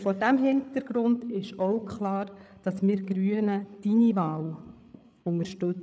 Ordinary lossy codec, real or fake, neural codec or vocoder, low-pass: none; fake; codec, 16 kHz, 8 kbps, FreqCodec, larger model; none